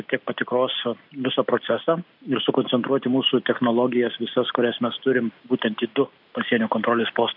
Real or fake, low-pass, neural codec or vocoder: real; 5.4 kHz; none